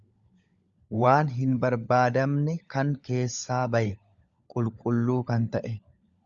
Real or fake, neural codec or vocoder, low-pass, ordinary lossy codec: fake; codec, 16 kHz, 16 kbps, FunCodec, trained on LibriTTS, 50 frames a second; 7.2 kHz; Opus, 64 kbps